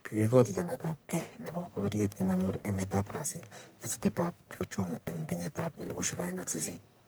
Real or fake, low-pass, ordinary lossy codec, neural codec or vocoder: fake; none; none; codec, 44.1 kHz, 1.7 kbps, Pupu-Codec